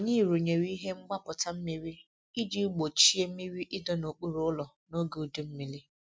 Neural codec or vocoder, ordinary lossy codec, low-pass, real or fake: none; none; none; real